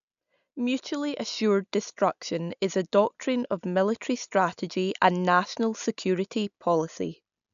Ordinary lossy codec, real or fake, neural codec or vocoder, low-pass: none; real; none; 7.2 kHz